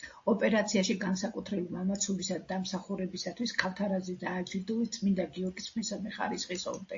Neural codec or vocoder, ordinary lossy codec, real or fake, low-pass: none; AAC, 48 kbps; real; 7.2 kHz